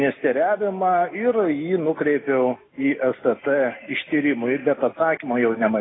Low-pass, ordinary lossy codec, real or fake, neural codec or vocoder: 7.2 kHz; AAC, 16 kbps; real; none